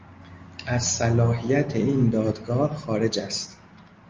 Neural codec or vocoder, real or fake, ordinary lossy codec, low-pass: none; real; Opus, 32 kbps; 7.2 kHz